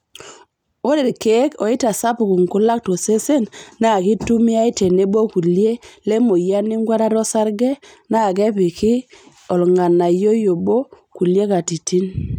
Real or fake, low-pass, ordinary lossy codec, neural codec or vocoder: real; 19.8 kHz; none; none